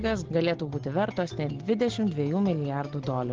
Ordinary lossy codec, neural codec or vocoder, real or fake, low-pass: Opus, 16 kbps; none; real; 7.2 kHz